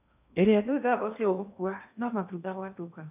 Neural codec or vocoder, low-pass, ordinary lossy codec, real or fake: codec, 16 kHz in and 24 kHz out, 0.8 kbps, FocalCodec, streaming, 65536 codes; 3.6 kHz; none; fake